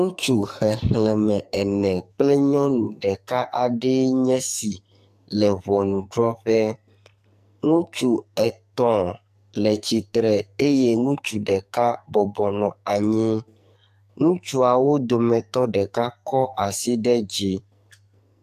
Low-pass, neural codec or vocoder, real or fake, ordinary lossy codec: 14.4 kHz; codec, 44.1 kHz, 2.6 kbps, SNAC; fake; AAC, 96 kbps